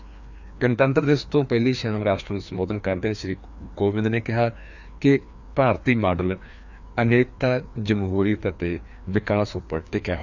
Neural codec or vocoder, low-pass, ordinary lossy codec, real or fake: codec, 16 kHz, 2 kbps, FreqCodec, larger model; 7.2 kHz; none; fake